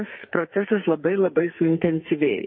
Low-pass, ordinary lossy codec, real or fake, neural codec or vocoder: 7.2 kHz; MP3, 24 kbps; fake; codec, 44.1 kHz, 2.6 kbps, SNAC